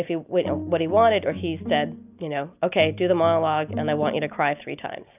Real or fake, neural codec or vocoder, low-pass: real; none; 3.6 kHz